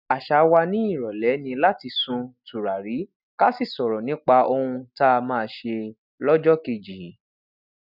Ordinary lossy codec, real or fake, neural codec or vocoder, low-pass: none; real; none; 5.4 kHz